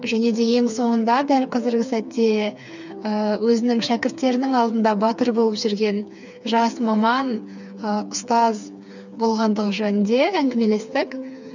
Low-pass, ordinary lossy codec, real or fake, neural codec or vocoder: 7.2 kHz; none; fake; codec, 16 kHz, 4 kbps, FreqCodec, smaller model